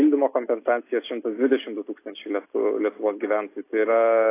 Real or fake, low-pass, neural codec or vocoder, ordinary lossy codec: real; 3.6 kHz; none; AAC, 24 kbps